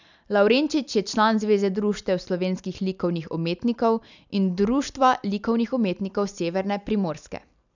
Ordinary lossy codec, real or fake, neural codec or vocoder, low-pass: none; real; none; 7.2 kHz